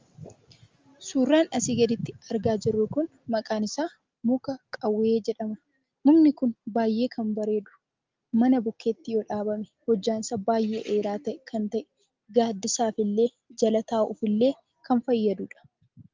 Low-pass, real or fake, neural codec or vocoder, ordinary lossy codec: 7.2 kHz; real; none; Opus, 32 kbps